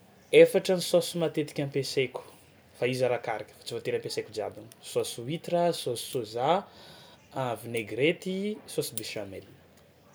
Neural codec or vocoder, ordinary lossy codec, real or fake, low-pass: none; none; real; none